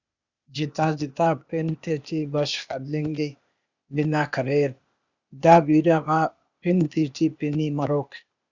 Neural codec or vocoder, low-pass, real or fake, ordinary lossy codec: codec, 16 kHz, 0.8 kbps, ZipCodec; 7.2 kHz; fake; Opus, 64 kbps